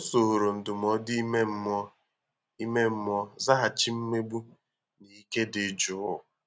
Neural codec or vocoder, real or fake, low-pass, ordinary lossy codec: none; real; none; none